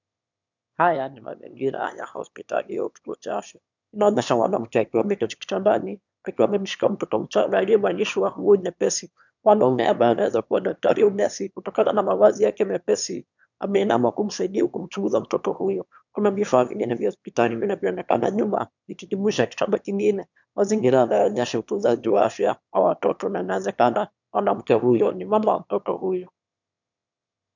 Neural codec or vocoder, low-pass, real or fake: autoencoder, 22.05 kHz, a latent of 192 numbers a frame, VITS, trained on one speaker; 7.2 kHz; fake